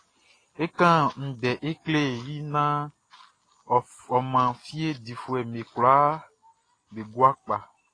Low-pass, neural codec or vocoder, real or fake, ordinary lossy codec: 9.9 kHz; none; real; AAC, 32 kbps